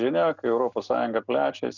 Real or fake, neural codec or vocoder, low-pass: real; none; 7.2 kHz